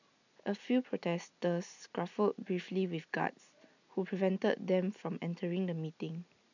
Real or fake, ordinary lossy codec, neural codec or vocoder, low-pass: real; none; none; 7.2 kHz